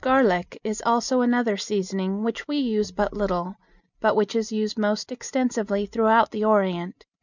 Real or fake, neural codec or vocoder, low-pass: real; none; 7.2 kHz